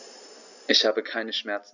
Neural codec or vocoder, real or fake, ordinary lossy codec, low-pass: none; real; none; 7.2 kHz